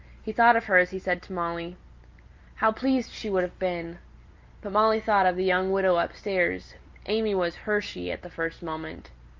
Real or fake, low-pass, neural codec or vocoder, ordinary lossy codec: real; 7.2 kHz; none; Opus, 32 kbps